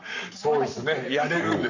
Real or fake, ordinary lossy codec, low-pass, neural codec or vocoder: fake; none; 7.2 kHz; codec, 44.1 kHz, 7.8 kbps, Pupu-Codec